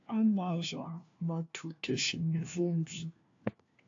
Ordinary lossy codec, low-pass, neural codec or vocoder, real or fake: AAC, 48 kbps; 7.2 kHz; codec, 16 kHz, 1 kbps, FunCodec, trained on LibriTTS, 50 frames a second; fake